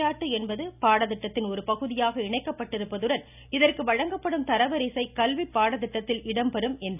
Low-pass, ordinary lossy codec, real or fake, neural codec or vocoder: 3.6 kHz; none; real; none